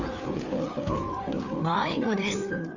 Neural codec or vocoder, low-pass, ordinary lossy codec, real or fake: codec, 16 kHz, 4 kbps, FreqCodec, larger model; 7.2 kHz; none; fake